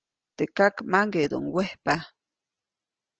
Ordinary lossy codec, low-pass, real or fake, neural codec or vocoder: Opus, 16 kbps; 7.2 kHz; real; none